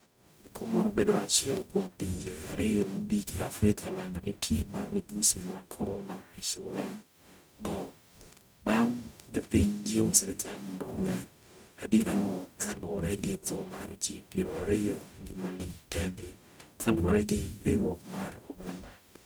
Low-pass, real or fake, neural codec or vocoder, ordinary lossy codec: none; fake; codec, 44.1 kHz, 0.9 kbps, DAC; none